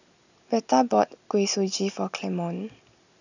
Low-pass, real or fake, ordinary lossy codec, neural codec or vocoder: 7.2 kHz; real; none; none